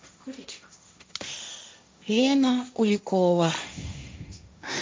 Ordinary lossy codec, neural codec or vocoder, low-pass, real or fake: none; codec, 16 kHz, 1.1 kbps, Voila-Tokenizer; none; fake